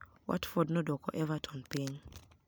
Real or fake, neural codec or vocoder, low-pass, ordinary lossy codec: real; none; none; none